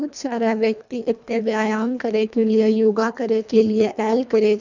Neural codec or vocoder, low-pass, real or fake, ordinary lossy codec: codec, 24 kHz, 1.5 kbps, HILCodec; 7.2 kHz; fake; none